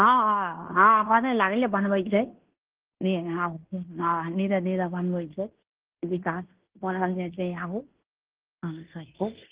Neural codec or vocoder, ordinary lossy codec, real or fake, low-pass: codec, 16 kHz in and 24 kHz out, 0.9 kbps, LongCat-Audio-Codec, fine tuned four codebook decoder; Opus, 16 kbps; fake; 3.6 kHz